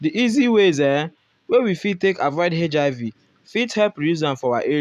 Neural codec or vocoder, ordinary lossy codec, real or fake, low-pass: none; none; real; 14.4 kHz